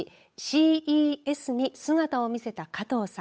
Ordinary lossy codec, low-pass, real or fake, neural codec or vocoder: none; none; fake; codec, 16 kHz, 8 kbps, FunCodec, trained on Chinese and English, 25 frames a second